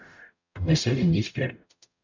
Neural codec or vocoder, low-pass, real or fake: codec, 44.1 kHz, 0.9 kbps, DAC; 7.2 kHz; fake